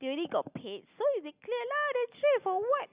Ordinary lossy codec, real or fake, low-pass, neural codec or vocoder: Opus, 64 kbps; real; 3.6 kHz; none